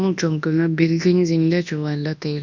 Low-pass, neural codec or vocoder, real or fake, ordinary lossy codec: 7.2 kHz; codec, 24 kHz, 0.9 kbps, WavTokenizer, large speech release; fake; none